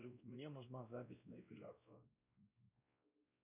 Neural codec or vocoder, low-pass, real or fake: codec, 16 kHz, 1 kbps, X-Codec, WavLM features, trained on Multilingual LibriSpeech; 3.6 kHz; fake